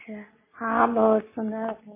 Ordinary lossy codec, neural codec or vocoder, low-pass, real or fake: MP3, 16 kbps; none; 3.6 kHz; real